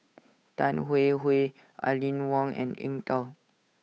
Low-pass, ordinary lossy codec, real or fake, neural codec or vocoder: none; none; fake; codec, 16 kHz, 8 kbps, FunCodec, trained on Chinese and English, 25 frames a second